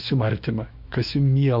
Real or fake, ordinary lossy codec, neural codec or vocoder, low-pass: fake; Opus, 64 kbps; autoencoder, 48 kHz, 128 numbers a frame, DAC-VAE, trained on Japanese speech; 5.4 kHz